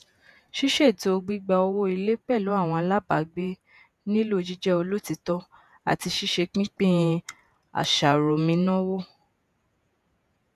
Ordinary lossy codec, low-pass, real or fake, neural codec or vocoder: none; 14.4 kHz; fake; vocoder, 48 kHz, 128 mel bands, Vocos